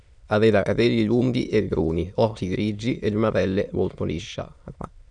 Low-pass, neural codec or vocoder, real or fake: 9.9 kHz; autoencoder, 22.05 kHz, a latent of 192 numbers a frame, VITS, trained on many speakers; fake